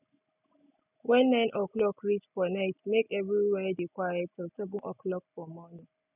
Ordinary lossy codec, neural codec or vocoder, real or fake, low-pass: none; none; real; 3.6 kHz